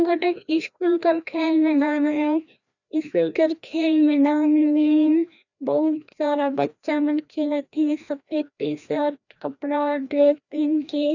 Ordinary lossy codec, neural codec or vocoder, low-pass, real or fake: none; codec, 16 kHz, 1 kbps, FreqCodec, larger model; 7.2 kHz; fake